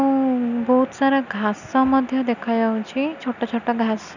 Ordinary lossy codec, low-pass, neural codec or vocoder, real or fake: none; 7.2 kHz; none; real